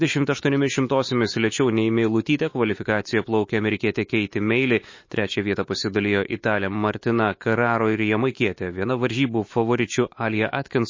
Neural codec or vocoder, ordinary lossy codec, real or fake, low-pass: autoencoder, 48 kHz, 128 numbers a frame, DAC-VAE, trained on Japanese speech; MP3, 32 kbps; fake; 7.2 kHz